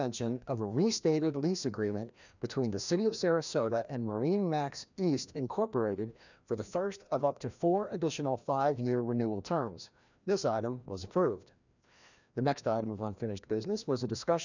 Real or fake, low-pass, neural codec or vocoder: fake; 7.2 kHz; codec, 16 kHz, 1 kbps, FreqCodec, larger model